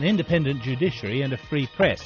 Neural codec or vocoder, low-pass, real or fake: none; 7.2 kHz; real